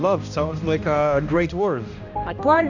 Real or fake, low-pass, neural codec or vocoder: fake; 7.2 kHz; codec, 16 kHz, 1 kbps, X-Codec, HuBERT features, trained on balanced general audio